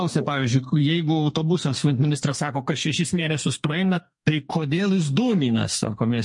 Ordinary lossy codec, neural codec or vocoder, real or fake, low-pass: MP3, 48 kbps; codec, 32 kHz, 1.9 kbps, SNAC; fake; 10.8 kHz